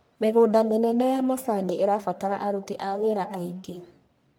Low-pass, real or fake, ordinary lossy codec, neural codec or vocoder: none; fake; none; codec, 44.1 kHz, 1.7 kbps, Pupu-Codec